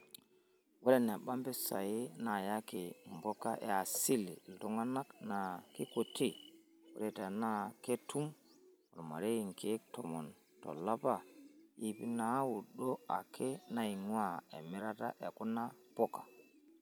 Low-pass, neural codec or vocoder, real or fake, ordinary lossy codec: none; none; real; none